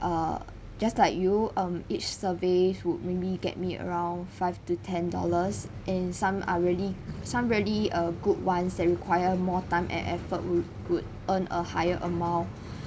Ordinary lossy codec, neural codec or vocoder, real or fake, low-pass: none; none; real; none